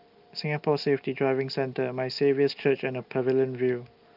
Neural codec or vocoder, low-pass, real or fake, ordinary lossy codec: none; 5.4 kHz; real; Opus, 24 kbps